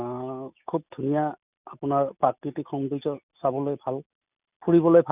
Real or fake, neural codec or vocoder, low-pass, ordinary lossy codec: real; none; 3.6 kHz; none